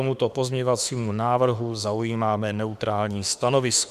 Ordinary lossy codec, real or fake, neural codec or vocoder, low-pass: Opus, 64 kbps; fake; autoencoder, 48 kHz, 32 numbers a frame, DAC-VAE, trained on Japanese speech; 14.4 kHz